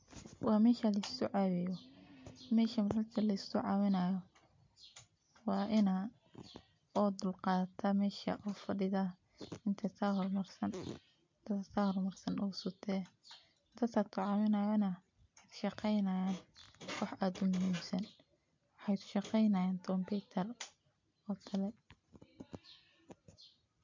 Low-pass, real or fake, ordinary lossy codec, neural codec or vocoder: 7.2 kHz; real; MP3, 48 kbps; none